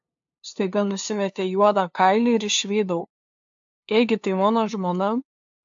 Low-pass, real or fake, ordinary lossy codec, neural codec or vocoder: 7.2 kHz; fake; AAC, 64 kbps; codec, 16 kHz, 2 kbps, FunCodec, trained on LibriTTS, 25 frames a second